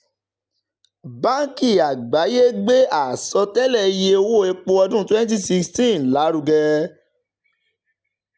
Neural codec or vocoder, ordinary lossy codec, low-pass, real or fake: none; none; none; real